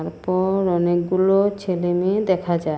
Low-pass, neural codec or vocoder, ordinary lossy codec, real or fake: none; none; none; real